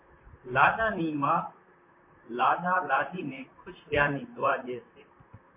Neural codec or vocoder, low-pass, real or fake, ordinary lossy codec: vocoder, 44.1 kHz, 128 mel bands, Pupu-Vocoder; 3.6 kHz; fake; MP3, 24 kbps